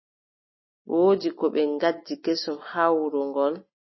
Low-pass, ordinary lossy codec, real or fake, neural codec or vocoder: 7.2 kHz; MP3, 24 kbps; real; none